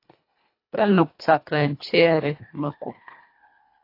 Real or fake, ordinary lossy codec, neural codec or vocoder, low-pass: fake; AAC, 32 kbps; codec, 24 kHz, 1.5 kbps, HILCodec; 5.4 kHz